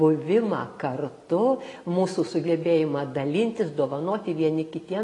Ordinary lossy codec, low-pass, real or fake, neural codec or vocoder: AAC, 32 kbps; 10.8 kHz; real; none